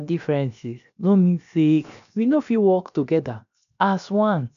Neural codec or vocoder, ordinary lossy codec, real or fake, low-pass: codec, 16 kHz, 0.7 kbps, FocalCodec; none; fake; 7.2 kHz